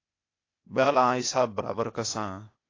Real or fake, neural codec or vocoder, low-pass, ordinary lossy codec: fake; codec, 16 kHz, 0.8 kbps, ZipCodec; 7.2 kHz; AAC, 32 kbps